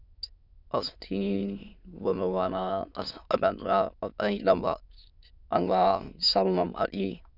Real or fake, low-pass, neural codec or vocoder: fake; 5.4 kHz; autoencoder, 22.05 kHz, a latent of 192 numbers a frame, VITS, trained on many speakers